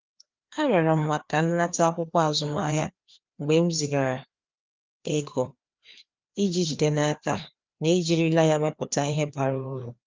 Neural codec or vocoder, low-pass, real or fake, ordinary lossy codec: codec, 16 kHz, 2 kbps, FreqCodec, larger model; 7.2 kHz; fake; Opus, 32 kbps